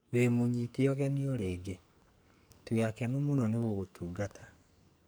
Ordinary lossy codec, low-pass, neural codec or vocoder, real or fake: none; none; codec, 44.1 kHz, 2.6 kbps, SNAC; fake